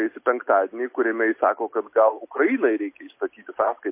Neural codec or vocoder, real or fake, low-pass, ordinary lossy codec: none; real; 3.6 kHz; MP3, 24 kbps